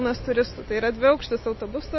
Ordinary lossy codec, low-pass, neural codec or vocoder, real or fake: MP3, 24 kbps; 7.2 kHz; none; real